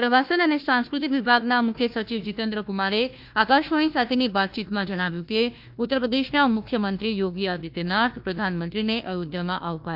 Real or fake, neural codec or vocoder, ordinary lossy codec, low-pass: fake; codec, 16 kHz, 1 kbps, FunCodec, trained on Chinese and English, 50 frames a second; MP3, 48 kbps; 5.4 kHz